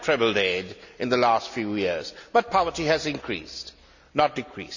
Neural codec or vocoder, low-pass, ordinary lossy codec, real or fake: none; 7.2 kHz; none; real